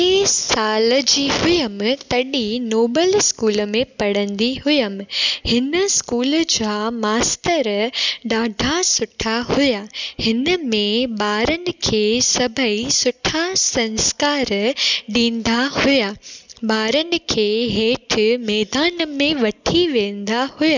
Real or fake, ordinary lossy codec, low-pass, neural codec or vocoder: real; none; 7.2 kHz; none